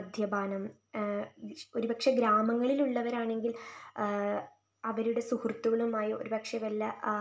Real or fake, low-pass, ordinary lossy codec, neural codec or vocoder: real; none; none; none